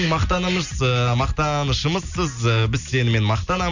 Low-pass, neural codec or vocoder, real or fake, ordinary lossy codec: 7.2 kHz; none; real; none